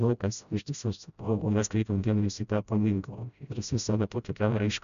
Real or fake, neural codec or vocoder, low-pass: fake; codec, 16 kHz, 0.5 kbps, FreqCodec, smaller model; 7.2 kHz